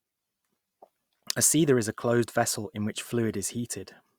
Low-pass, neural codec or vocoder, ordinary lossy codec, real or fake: 19.8 kHz; none; Opus, 64 kbps; real